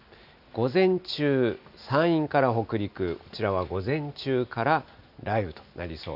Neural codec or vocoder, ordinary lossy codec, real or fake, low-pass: none; none; real; 5.4 kHz